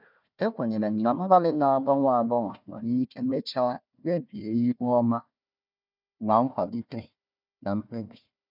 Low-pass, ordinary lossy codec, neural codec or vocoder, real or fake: 5.4 kHz; none; codec, 16 kHz, 1 kbps, FunCodec, trained on Chinese and English, 50 frames a second; fake